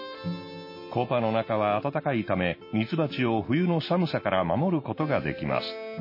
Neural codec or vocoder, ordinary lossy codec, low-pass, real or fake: none; MP3, 24 kbps; 5.4 kHz; real